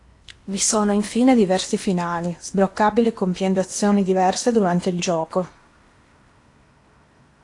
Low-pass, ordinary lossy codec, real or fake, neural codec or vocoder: 10.8 kHz; AAC, 48 kbps; fake; codec, 16 kHz in and 24 kHz out, 0.8 kbps, FocalCodec, streaming, 65536 codes